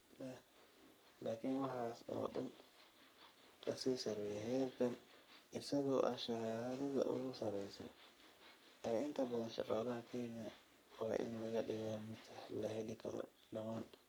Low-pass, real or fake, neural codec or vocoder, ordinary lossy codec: none; fake; codec, 44.1 kHz, 3.4 kbps, Pupu-Codec; none